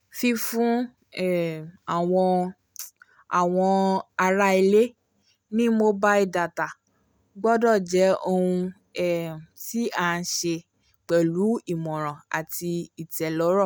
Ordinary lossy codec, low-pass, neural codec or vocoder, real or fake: none; none; none; real